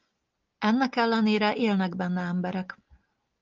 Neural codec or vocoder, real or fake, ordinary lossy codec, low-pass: none; real; Opus, 32 kbps; 7.2 kHz